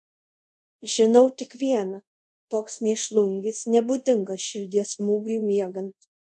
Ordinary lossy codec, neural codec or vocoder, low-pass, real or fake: MP3, 96 kbps; codec, 24 kHz, 0.5 kbps, DualCodec; 10.8 kHz; fake